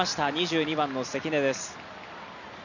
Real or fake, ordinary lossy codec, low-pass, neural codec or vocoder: real; none; 7.2 kHz; none